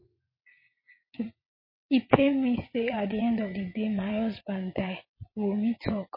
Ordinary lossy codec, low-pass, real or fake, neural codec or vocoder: MP3, 24 kbps; 5.4 kHz; fake; vocoder, 22.05 kHz, 80 mel bands, WaveNeXt